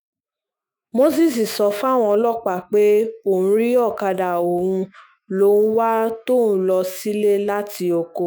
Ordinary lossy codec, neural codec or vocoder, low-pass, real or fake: none; autoencoder, 48 kHz, 128 numbers a frame, DAC-VAE, trained on Japanese speech; none; fake